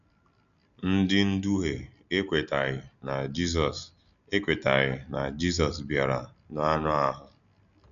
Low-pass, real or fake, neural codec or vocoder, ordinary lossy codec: 7.2 kHz; real; none; none